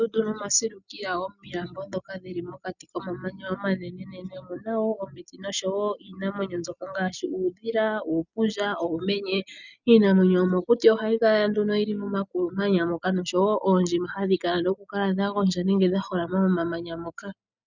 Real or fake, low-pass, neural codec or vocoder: real; 7.2 kHz; none